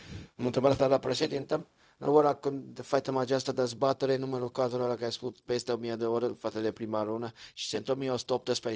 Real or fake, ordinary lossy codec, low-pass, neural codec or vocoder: fake; none; none; codec, 16 kHz, 0.4 kbps, LongCat-Audio-Codec